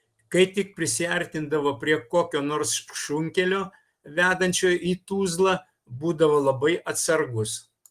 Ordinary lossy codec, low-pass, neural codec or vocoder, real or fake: Opus, 24 kbps; 14.4 kHz; none; real